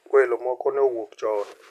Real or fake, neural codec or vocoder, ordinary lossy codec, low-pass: real; none; none; 14.4 kHz